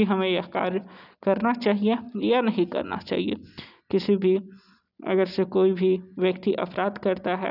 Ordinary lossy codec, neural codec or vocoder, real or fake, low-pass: none; none; real; 5.4 kHz